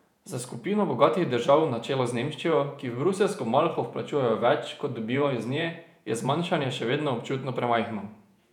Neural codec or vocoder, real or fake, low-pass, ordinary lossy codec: vocoder, 48 kHz, 128 mel bands, Vocos; fake; 19.8 kHz; none